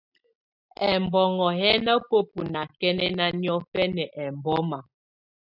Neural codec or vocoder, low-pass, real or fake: none; 5.4 kHz; real